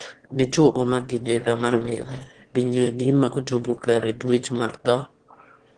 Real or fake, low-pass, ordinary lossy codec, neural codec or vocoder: fake; 9.9 kHz; Opus, 16 kbps; autoencoder, 22.05 kHz, a latent of 192 numbers a frame, VITS, trained on one speaker